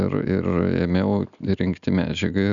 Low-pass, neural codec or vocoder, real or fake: 7.2 kHz; none; real